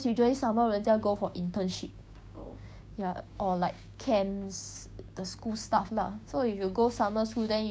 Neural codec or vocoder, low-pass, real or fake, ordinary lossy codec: codec, 16 kHz, 6 kbps, DAC; none; fake; none